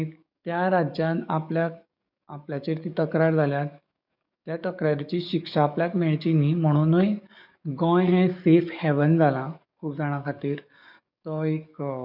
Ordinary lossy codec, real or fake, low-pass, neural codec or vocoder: none; fake; 5.4 kHz; codec, 44.1 kHz, 7.8 kbps, DAC